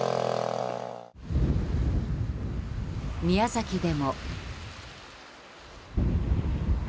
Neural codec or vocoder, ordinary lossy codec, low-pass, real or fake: none; none; none; real